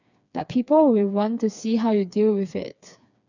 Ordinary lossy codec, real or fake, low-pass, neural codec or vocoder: none; fake; 7.2 kHz; codec, 16 kHz, 4 kbps, FreqCodec, smaller model